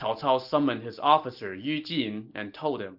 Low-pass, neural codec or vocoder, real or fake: 5.4 kHz; none; real